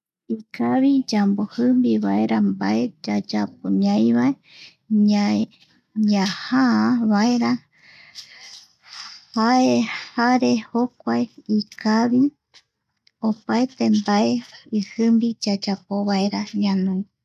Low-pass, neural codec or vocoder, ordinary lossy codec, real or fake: 14.4 kHz; none; none; real